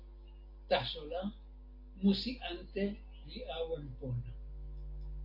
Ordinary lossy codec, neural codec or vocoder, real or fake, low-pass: AAC, 32 kbps; none; real; 5.4 kHz